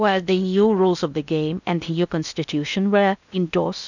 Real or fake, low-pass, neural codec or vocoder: fake; 7.2 kHz; codec, 16 kHz in and 24 kHz out, 0.6 kbps, FocalCodec, streaming, 2048 codes